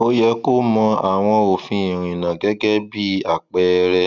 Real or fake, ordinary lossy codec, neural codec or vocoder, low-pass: real; none; none; 7.2 kHz